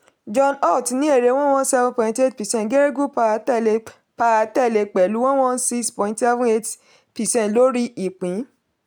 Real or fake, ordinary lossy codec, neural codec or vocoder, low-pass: real; none; none; none